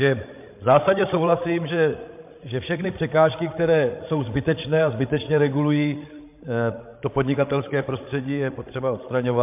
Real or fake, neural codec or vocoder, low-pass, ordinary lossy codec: fake; codec, 16 kHz, 16 kbps, FreqCodec, larger model; 3.6 kHz; MP3, 32 kbps